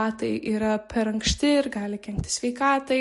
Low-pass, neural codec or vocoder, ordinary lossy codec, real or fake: 14.4 kHz; none; MP3, 48 kbps; real